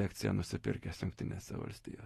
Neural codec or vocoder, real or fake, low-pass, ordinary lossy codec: none; real; 19.8 kHz; AAC, 32 kbps